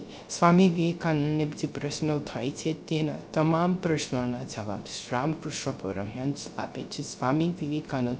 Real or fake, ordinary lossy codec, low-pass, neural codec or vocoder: fake; none; none; codec, 16 kHz, 0.3 kbps, FocalCodec